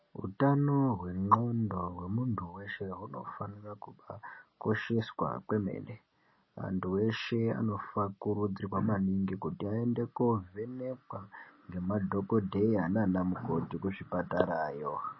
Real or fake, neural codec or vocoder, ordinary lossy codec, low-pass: real; none; MP3, 24 kbps; 7.2 kHz